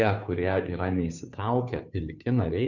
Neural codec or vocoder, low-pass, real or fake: codec, 16 kHz, 2 kbps, FunCodec, trained on Chinese and English, 25 frames a second; 7.2 kHz; fake